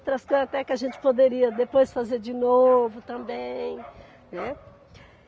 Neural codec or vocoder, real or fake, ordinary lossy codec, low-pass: none; real; none; none